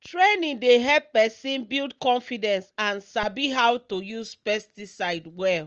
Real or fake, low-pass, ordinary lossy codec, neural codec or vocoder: real; 7.2 kHz; Opus, 24 kbps; none